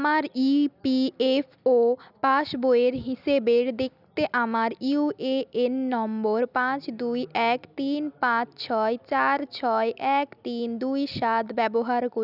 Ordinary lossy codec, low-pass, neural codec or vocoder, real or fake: none; 5.4 kHz; none; real